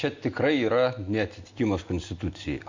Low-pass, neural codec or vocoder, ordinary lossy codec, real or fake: 7.2 kHz; none; MP3, 48 kbps; real